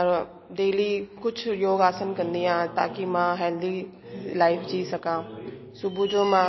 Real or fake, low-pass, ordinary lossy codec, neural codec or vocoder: real; 7.2 kHz; MP3, 24 kbps; none